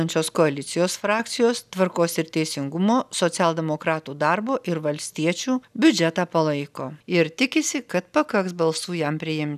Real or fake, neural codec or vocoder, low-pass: real; none; 14.4 kHz